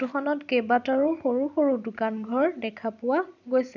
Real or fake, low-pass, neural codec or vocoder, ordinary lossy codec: fake; 7.2 kHz; vocoder, 44.1 kHz, 128 mel bands every 256 samples, BigVGAN v2; none